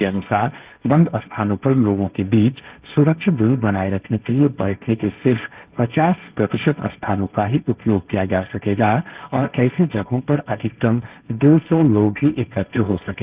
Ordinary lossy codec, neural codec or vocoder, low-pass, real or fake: Opus, 32 kbps; codec, 16 kHz, 1.1 kbps, Voila-Tokenizer; 3.6 kHz; fake